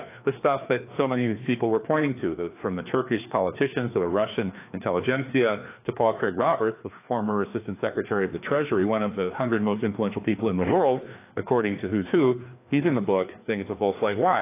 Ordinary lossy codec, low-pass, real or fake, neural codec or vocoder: AAC, 24 kbps; 3.6 kHz; fake; codec, 16 kHz, 2 kbps, FreqCodec, larger model